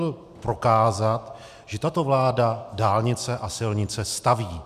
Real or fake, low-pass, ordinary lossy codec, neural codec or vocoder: real; 14.4 kHz; MP3, 96 kbps; none